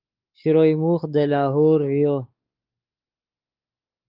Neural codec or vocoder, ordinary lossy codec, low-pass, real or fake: codec, 16 kHz, 4 kbps, X-Codec, WavLM features, trained on Multilingual LibriSpeech; Opus, 32 kbps; 5.4 kHz; fake